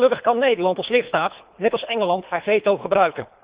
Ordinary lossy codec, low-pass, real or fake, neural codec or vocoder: Opus, 64 kbps; 3.6 kHz; fake; codec, 24 kHz, 3 kbps, HILCodec